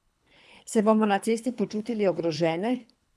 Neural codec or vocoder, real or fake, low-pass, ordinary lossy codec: codec, 24 kHz, 3 kbps, HILCodec; fake; none; none